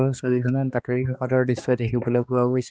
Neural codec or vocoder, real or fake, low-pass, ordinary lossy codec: codec, 16 kHz, 2 kbps, X-Codec, HuBERT features, trained on balanced general audio; fake; none; none